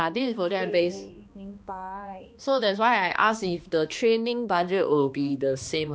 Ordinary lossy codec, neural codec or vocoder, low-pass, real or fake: none; codec, 16 kHz, 2 kbps, X-Codec, HuBERT features, trained on balanced general audio; none; fake